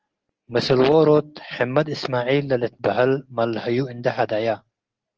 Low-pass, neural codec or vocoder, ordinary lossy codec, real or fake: 7.2 kHz; none; Opus, 16 kbps; real